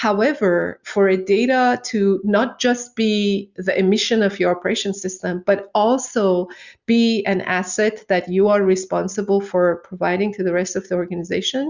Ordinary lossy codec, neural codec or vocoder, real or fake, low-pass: Opus, 64 kbps; none; real; 7.2 kHz